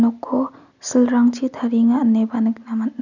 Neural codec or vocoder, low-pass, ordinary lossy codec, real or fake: none; 7.2 kHz; none; real